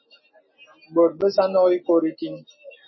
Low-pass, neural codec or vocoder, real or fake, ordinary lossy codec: 7.2 kHz; none; real; MP3, 24 kbps